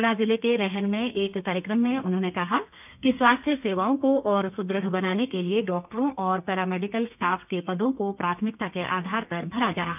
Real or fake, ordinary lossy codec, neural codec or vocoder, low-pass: fake; none; codec, 32 kHz, 1.9 kbps, SNAC; 3.6 kHz